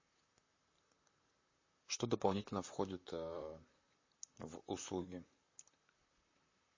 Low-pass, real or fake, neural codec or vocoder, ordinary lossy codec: 7.2 kHz; fake; vocoder, 44.1 kHz, 128 mel bands, Pupu-Vocoder; MP3, 32 kbps